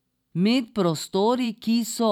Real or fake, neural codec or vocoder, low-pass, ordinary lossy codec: fake; vocoder, 44.1 kHz, 128 mel bands every 512 samples, BigVGAN v2; 19.8 kHz; none